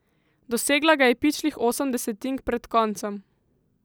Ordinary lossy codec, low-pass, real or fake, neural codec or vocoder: none; none; real; none